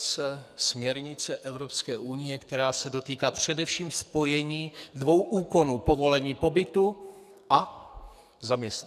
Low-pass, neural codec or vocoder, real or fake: 14.4 kHz; codec, 44.1 kHz, 2.6 kbps, SNAC; fake